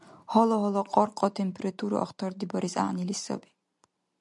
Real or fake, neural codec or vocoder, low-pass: real; none; 10.8 kHz